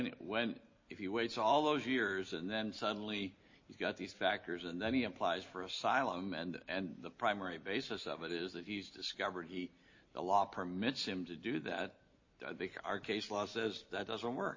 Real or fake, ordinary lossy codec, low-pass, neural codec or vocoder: real; MP3, 32 kbps; 7.2 kHz; none